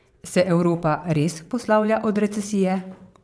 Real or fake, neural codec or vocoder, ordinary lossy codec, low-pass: fake; vocoder, 22.05 kHz, 80 mel bands, WaveNeXt; none; none